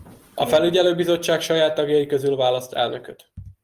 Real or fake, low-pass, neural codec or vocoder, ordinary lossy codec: real; 14.4 kHz; none; Opus, 32 kbps